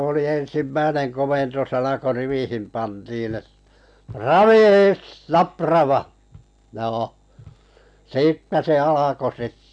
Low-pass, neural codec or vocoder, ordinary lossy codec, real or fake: 9.9 kHz; none; none; real